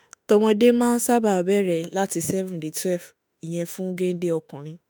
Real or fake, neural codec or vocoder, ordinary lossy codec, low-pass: fake; autoencoder, 48 kHz, 32 numbers a frame, DAC-VAE, trained on Japanese speech; none; none